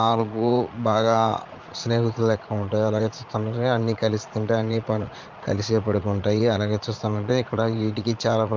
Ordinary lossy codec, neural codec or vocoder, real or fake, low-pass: Opus, 24 kbps; vocoder, 44.1 kHz, 80 mel bands, Vocos; fake; 7.2 kHz